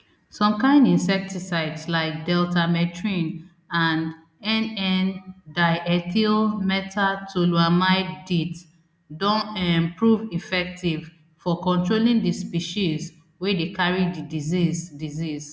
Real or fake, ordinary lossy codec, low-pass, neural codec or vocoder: real; none; none; none